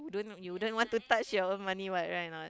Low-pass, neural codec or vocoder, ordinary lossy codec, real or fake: none; none; none; real